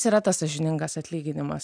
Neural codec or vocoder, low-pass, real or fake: none; 9.9 kHz; real